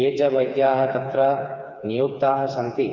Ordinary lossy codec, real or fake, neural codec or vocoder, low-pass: none; fake; codec, 16 kHz, 4 kbps, FreqCodec, smaller model; 7.2 kHz